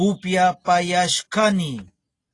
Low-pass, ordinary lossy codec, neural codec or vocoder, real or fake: 10.8 kHz; AAC, 32 kbps; none; real